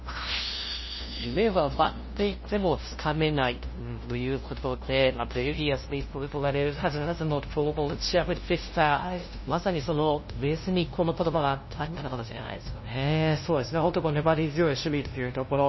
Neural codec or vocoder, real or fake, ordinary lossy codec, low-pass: codec, 16 kHz, 0.5 kbps, FunCodec, trained on LibriTTS, 25 frames a second; fake; MP3, 24 kbps; 7.2 kHz